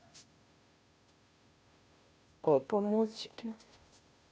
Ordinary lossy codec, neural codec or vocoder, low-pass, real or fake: none; codec, 16 kHz, 0.5 kbps, FunCodec, trained on Chinese and English, 25 frames a second; none; fake